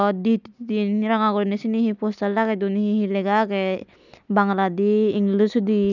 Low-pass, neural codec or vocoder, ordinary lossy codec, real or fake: 7.2 kHz; none; none; real